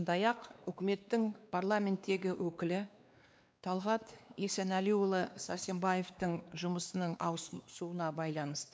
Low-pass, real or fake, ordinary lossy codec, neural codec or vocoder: none; fake; none; codec, 16 kHz, 2 kbps, X-Codec, WavLM features, trained on Multilingual LibriSpeech